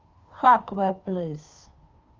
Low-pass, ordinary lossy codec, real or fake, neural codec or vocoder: 7.2 kHz; Opus, 32 kbps; fake; codec, 16 kHz, 2 kbps, FunCodec, trained on Chinese and English, 25 frames a second